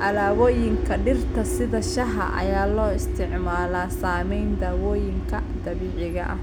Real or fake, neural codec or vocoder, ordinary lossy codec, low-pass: real; none; none; none